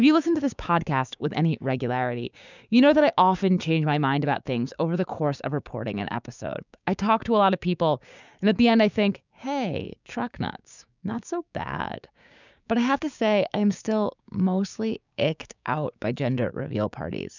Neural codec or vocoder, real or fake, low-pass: codec, 16 kHz, 6 kbps, DAC; fake; 7.2 kHz